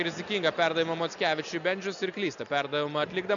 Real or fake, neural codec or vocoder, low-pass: real; none; 7.2 kHz